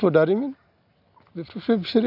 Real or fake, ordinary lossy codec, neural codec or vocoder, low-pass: real; none; none; 5.4 kHz